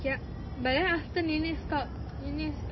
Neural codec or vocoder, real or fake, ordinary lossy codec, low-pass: none; real; MP3, 24 kbps; 7.2 kHz